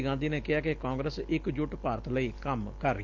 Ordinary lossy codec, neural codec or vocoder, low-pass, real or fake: Opus, 24 kbps; none; 7.2 kHz; real